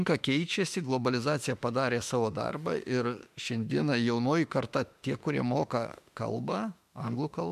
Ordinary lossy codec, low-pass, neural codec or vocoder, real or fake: MP3, 96 kbps; 14.4 kHz; autoencoder, 48 kHz, 32 numbers a frame, DAC-VAE, trained on Japanese speech; fake